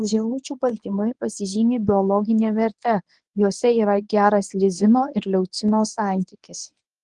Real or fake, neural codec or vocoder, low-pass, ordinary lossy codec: fake; codec, 24 kHz, 0.9 kbps, WavTokenizer, medium speech release version 1; 10.8 kHz; Opus, 24 kbps